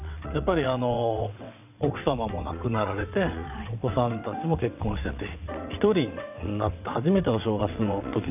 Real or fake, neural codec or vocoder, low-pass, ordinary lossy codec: fake; vocoder, 22.05 kHz, 80 mel bands, WaveNeXt; 3.6 kHz; none